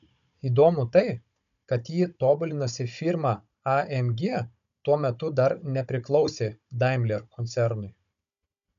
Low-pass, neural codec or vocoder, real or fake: 7.2 kHz; codec, 16 kHz, 16 kbps, FunCodec, trained on Chinese and English, 50 frames a second; fake